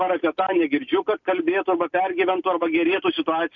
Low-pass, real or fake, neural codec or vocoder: 7.2 kHz; real; none